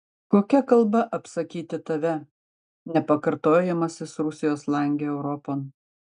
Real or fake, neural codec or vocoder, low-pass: real; none; 9.9 kHz